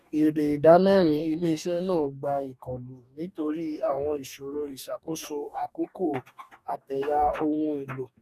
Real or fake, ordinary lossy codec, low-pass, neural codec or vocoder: fake; none; 14.4 kHz; codec, 44.1 kHz, 2.6 kbps, DAC